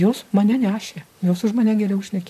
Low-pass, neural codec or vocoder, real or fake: 14.4 kHz; vocoder, 44.1 kHz, 128 mel bands, Pupu-Vocoder; fake